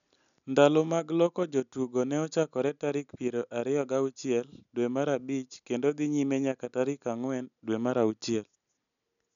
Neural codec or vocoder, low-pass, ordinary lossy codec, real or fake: none; 7.2 kHz; none; real